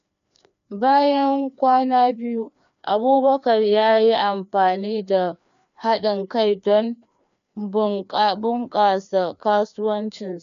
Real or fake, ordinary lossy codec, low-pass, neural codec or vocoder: fake; none; 7.2 kHz; codec, 16 kHz, 2 kbps, FreqCodec, larger model